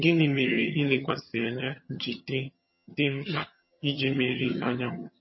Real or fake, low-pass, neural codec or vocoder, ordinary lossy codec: fake; 7.2 kHz; vocoder, 22.05 kHz, 80 mel bands, HiFi-GAN; MP3, 24 kbps